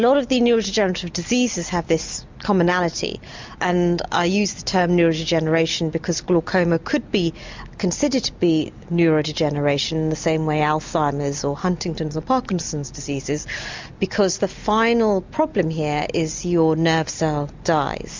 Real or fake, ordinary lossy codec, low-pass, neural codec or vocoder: real; MP3, 64 kbps; 7.2 kHz; none